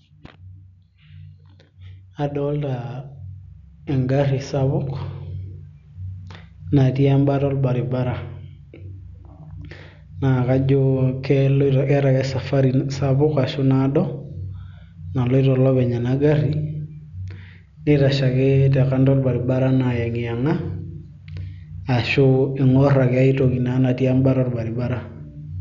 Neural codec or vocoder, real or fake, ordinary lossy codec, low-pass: none; real; MP3, 96 kbps; 7.2 kHz